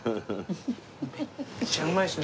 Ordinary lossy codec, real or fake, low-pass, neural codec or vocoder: none; real; none; none